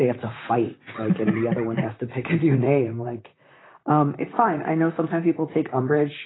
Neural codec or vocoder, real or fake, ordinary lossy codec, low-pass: vocoder, 44.1 kHz, 128 mel bands, Pupu-Vocoder; fake; AAC, 16 kbps; 7.2 kHz